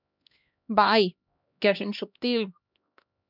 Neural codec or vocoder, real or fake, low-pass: codec, 16 kHz, 1 kbps, X-Codec, HuBERT features, trained on LibriSpeech; fake; 5.4 kHz